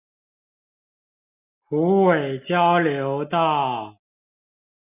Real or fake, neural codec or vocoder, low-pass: real; none; 3.6 kHz